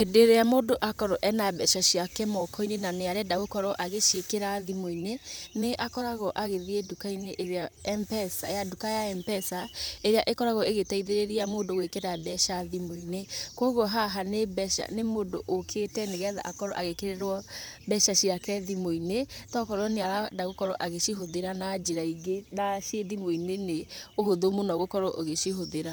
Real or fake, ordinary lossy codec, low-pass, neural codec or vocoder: fake; none; none; vocoder, 44.1 kHz, 128 mel bands, Pupu-Vocoder